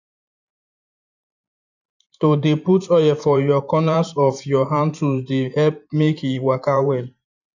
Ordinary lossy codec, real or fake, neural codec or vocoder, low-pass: none; fake; vocoder, 44.1 kHz, 128 mel bands every 512 samples, BigVGAN v2; 7.2 kHz